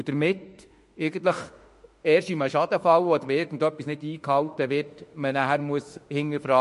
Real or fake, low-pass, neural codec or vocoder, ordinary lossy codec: fake; 14.4 kHz; autoencoder, 48 kHz, 32 numbers a frame, DAC-VAE, trained on Japanese speech; MP3, 48 kbps